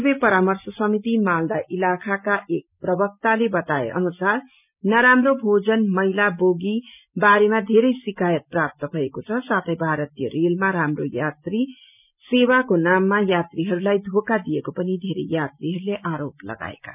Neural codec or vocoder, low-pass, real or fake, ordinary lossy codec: none; 3.6 kHz; real; none